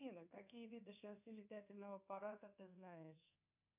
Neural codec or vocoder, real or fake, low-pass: codec, 24 kHz, 1.2 kbps, DualCodec; fake; 3.6 kHz